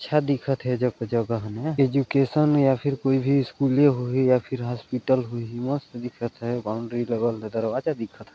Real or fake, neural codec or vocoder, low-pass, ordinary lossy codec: real; none; none; none